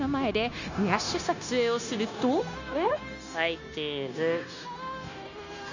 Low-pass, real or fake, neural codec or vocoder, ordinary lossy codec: 7.2 kHz; fake; codec, 16 kHz, 0.9 kbps, LongCat-Audio-Codec; none